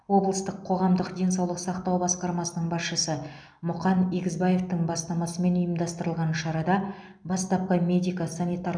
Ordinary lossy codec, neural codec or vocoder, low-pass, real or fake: none; none; 9.9 kHz; real